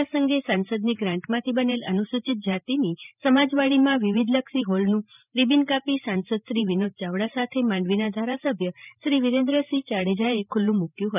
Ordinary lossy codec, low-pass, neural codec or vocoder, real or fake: none; 3.6 kHz; vocoder, 44.1 kHz, 128 mel bands every 512 samples, BigVGAN v2; fake